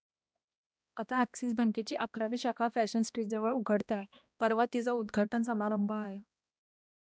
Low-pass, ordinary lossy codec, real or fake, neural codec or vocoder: none; none; fake; codec, 16 kHz, 1 kbps, X-Codec, HuBERT features, trained on balanced general audio